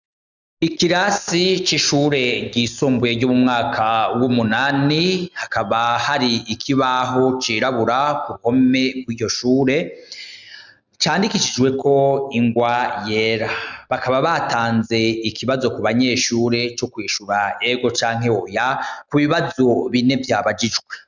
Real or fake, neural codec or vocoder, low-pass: real; none; 7.2 kHz